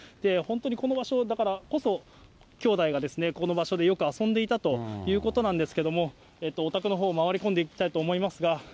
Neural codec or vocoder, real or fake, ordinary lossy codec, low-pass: none; real; none; none